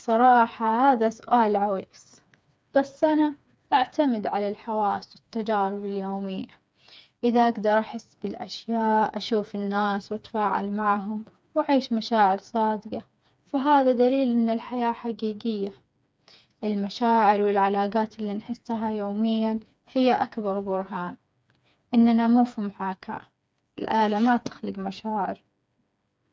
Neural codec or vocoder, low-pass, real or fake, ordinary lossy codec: codec, 16 kHz, 4 kbps, FreqCodec, smaller model; none; fake; none